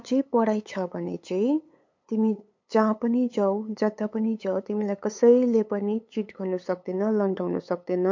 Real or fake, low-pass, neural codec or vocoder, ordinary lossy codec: fake; 7.2 kHz; codec, 16 kHz, 8 kbps, FunCodec, trained on LibriTTS, 25 frames a second; MP3, 48 kbps